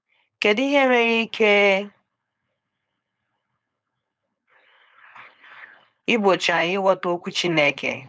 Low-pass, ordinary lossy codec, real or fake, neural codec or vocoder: none; none; fake; codec, 16 kHz, 4.8 kbps, FACodec